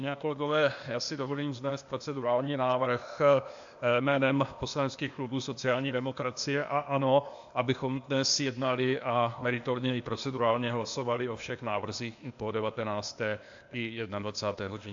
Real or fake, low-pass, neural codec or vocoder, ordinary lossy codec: fake; 7.2 kHz; codec, 16 kHz, 0.8 kbps, ZipCodec; AAC, 64 kbps